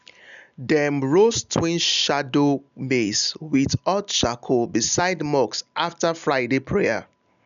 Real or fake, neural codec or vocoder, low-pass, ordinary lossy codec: real; none; 7.2 kHz; none